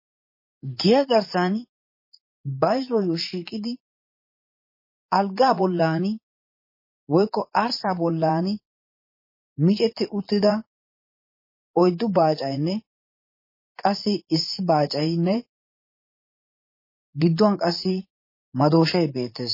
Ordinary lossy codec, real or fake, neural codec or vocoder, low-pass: MP3, 24 kbps; fake; vocoder, 44.1 kHz, 80 mel bands, Vocos; 5.4 kHz